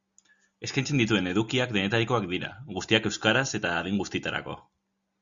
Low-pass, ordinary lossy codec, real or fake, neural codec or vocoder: 7.2 kHz; Opus, 64 kbps; real; none